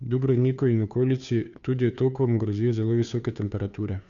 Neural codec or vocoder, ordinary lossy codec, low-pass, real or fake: codec, 16 kHz, 2 kbps, FunCodec, trained on Chinese and English, 25 frames a second; none; 7.2 kHz; fake